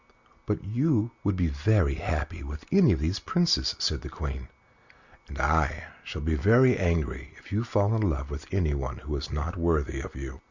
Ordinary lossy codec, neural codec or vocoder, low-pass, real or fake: Opus, 64 kbps; none; 7.2 kHz; real